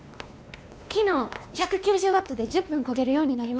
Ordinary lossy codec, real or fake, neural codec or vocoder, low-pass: none; fake; codec, 16 kHz, 2 kbps, X-Codec, WavLM features, trained on Multilingual LibriSpeech; none